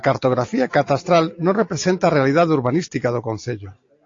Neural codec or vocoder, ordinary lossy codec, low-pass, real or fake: none; AAC, 48 kbps; 7.2 kHz; real